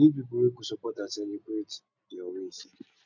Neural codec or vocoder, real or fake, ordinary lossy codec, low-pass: none; real; none; 7.2 kHz